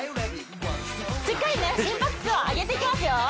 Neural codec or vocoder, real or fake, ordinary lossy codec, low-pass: none; real; none; none